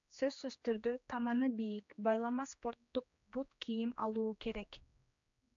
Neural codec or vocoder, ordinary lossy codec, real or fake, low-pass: codec, 16 kHz, 2 kbps, X-Codec, HuBERT features, trained on general audio; AAC, 64 kbps; fake; 7.2 kHz